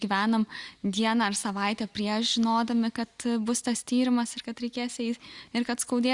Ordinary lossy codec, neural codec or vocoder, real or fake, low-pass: Opus, 64 kbps; none; real; 10.8 kHz